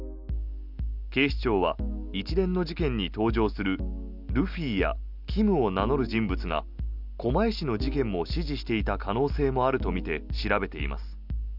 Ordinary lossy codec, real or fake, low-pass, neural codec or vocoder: none; real; 5.4 kHz; none